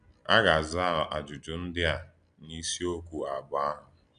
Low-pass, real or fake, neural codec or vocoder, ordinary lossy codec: 9.9 kHz; real; none; none